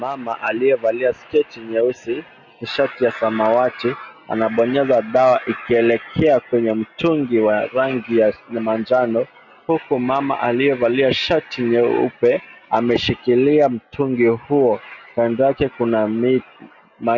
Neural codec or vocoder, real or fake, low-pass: none; real; 7.2 kHz